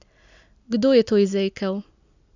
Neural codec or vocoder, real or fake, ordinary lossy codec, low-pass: none; real; none; 7.2 kHz